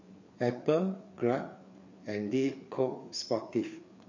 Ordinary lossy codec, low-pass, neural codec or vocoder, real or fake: MP3, 48 kbps; 7.2 kHz; codec, 16 kHz, 4 kbps, FreqCodec, larger model; fake